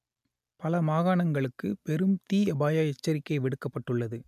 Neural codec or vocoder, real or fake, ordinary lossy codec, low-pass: none; real; none; 14.4 kHz